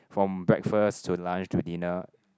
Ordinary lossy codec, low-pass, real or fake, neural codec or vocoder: none; none; real; none